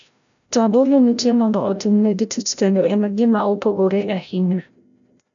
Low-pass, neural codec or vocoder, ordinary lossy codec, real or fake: 7.2 kHz; codec, 16 kHz, 0.5 kbps, FreqCodec, larger model; none; fake